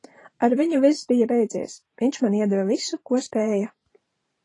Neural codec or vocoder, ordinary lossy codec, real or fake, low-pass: vocoder, 44.1 kHz, 128 mel bands every 512 samples, BigVGAN v2; AAC, 32 kbps; fake; 10.8 kHz